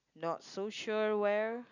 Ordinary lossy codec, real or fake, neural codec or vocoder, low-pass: none; real; none; 7.2 kHz